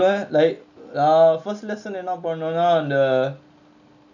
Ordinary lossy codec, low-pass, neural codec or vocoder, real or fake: none; 7.2 kHz; none; real